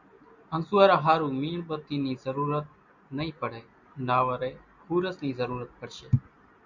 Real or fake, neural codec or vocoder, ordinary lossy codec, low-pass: real; none; MP3, 64 kbps; 7.2 kHz